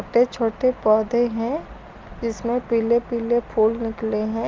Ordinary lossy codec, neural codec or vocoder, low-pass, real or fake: Opus, 32 kbps; none; 7.2 kHz; real